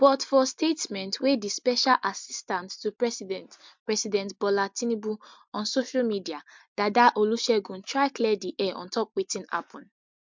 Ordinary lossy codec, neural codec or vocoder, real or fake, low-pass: MP3, 64 kbps; none; real; 7.2 kHz